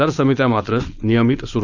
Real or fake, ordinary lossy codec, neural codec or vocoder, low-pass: fake; none; codec, 16 kHz, 4.8 kbps, FACodec; 7.2 kHz